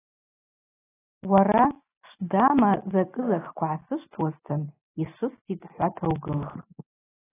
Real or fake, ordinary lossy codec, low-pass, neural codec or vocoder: real; AAC, 16 kbps; 3.6 kHz; none